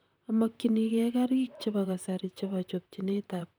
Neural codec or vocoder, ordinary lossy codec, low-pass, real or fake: vocoder, 44.1 kHz, 128 mel bands, Pupu-Vocoder; none; none; fake